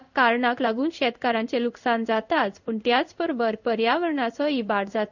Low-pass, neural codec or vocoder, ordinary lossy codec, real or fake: 7.2 kHz; codec, 16 kHz in and 24 kHz out, 1 kbps, XY-Tokenizer; none; fake